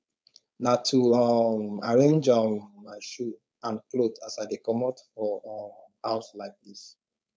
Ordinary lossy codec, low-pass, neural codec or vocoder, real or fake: none; none; codec, 16 kHz, 4.8 kbps, FACodec; fake